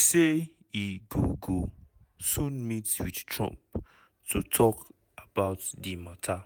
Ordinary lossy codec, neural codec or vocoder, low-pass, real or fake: none; vocoder, 48 kHz, 128 mel bands, Vocos; none; fake